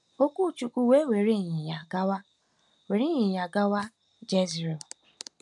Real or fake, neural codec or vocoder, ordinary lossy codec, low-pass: real; none; none; 10.8 kHz